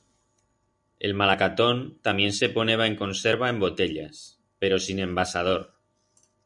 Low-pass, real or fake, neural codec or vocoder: 10.8 kHz; real; none